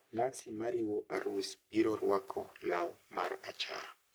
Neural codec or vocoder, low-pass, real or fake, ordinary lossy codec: codec, 44.1 kHz, 3.4 kbps, Pupu-Codec; none; fake; none